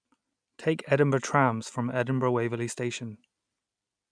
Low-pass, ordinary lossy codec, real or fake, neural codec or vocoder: 9.9 kHz; none; real; none